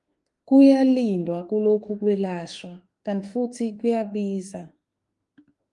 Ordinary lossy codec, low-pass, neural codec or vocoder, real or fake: Opus, 24 kbps; 10.8 kHz; autoencoder, 48 kHz, 32 numbers a frame, DAC-VAE, trained on Japanese speech; fake